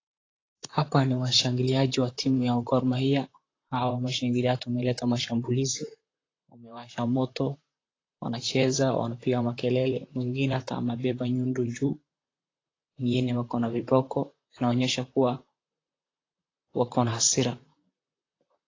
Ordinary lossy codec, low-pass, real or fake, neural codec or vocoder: AAC, 32 kbps; 7.2 kHz; fake; vocoder, 44.1 kHz, 128 mel bands, Pupu-Vocoder